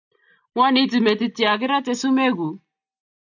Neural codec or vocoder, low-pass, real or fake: none; 7.2 kHz; real